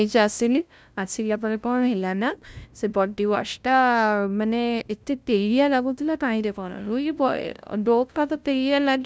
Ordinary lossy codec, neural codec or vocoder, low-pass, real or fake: none; codec, 16 kHz, 0.5 kbps, FunCodec, trained on LibriTTS, 25 frames a second; none; fake